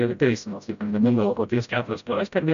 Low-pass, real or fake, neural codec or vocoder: 7.2 kHz; fake; codec, 16 kHz, 0.5 kbps, FreqCodec, smaller model